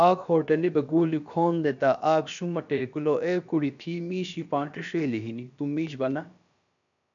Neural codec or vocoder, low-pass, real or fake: codec, 16 kHz, about 1 kbps, DyCAST, with the encoder's durations; 7.2 kHz; fake